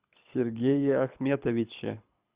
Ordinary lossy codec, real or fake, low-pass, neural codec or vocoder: Opus, 64 kbps; fake; 3.6 kHz; codec, 24 kHz, 6 kbps, HILCodec